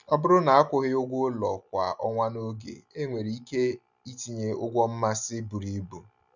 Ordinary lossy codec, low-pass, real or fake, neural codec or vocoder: none; 7.2 kHz; real; none